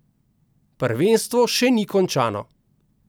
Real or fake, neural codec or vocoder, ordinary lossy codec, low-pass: real; none; none; none